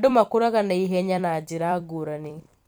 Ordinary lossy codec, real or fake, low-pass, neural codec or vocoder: none; fake; none; vocoder, 44.1 kHz, 128 mel bands every 256 samples, BigVGAN v2